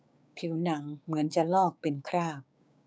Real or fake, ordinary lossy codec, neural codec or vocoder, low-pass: fake; none; codec, 16 kHz, 6 kbps, DAC; none